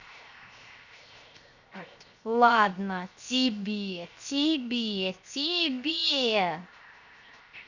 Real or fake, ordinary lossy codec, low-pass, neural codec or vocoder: fake; none; 7.2 kHz; codec, 16 kHz, 0.7 kbps, FocalCodec